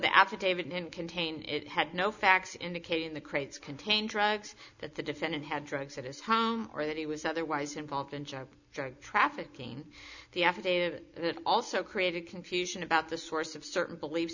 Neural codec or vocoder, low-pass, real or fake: none; 7.2 kHz; real